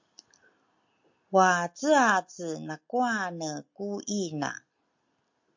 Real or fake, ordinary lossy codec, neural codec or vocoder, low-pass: real; MP3, 48 kbps; none; 7.2 kHz